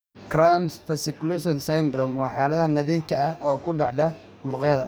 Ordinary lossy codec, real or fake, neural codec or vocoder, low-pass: none; fake; codec, 44.1 kHz, 2.6 kbps, DAC; none